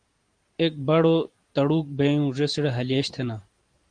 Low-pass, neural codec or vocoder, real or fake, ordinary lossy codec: 9.9 kHz; vocoder, 44.1 kHz, 128 mel bands every 512 samples, BigVGAN v2; fake; Opus, 24 kbps